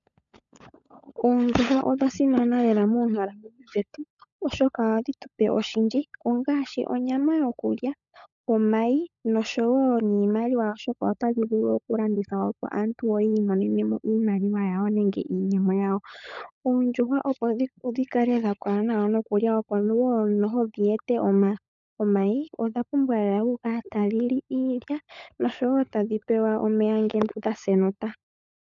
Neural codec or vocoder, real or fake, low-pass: codec, 16 kHz, 16 kbps, FunCodec, trained on LibriTTS, 50 frames a second; fake; 7.2 kHz